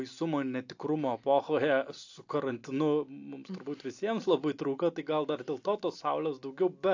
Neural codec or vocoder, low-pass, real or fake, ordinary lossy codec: none; 7.2 kHz; real; AAC, 48 kbps